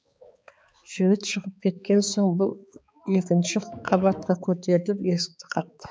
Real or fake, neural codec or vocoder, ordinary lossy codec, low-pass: fake; codec, 16 kHz, 4 kbps, X-Codec, HuBERT features, trained on balanced general audio; none; none